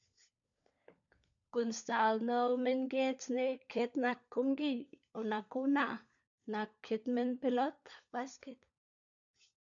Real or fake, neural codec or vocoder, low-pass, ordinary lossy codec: fake; codec, 16 kHz, 16 kbps, FunCodec, trained on LibriTTS, 50 frames a second; 7.2 kHz; none